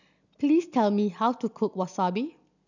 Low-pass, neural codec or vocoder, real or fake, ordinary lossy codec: 7.2 kHz; none; real; none